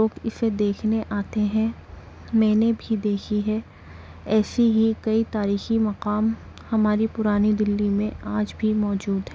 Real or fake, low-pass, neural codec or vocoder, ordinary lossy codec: real; none; none; none